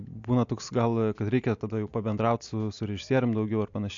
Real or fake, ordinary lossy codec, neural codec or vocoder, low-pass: real; AAC, 64 kbps; none; 7.2 kHz